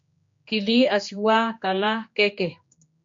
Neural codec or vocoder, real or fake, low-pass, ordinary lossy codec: codec, 16 kHz, 2 kbps, X-Codec, HuBERT features, trained on general audio; fake; 7.2 kHz; MP3, 48 kbps